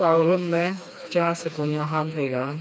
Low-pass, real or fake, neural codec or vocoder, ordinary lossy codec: none; fake; codec, 16 kHz, 2 kbps, FreqCodec, smaller model; none